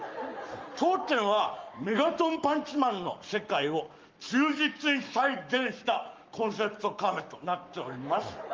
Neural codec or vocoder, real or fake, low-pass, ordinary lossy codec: codec, 44.1 kHz, 7.8 kbps, Pupu-Codec; fake; 7.2 kHz; Opus, 32 kbps